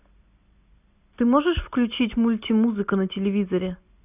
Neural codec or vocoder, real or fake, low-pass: none; real; 3.6 kHz